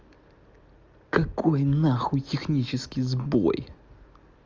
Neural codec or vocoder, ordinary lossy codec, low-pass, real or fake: none; Opus, 32 kbps; 7.2 kHz; real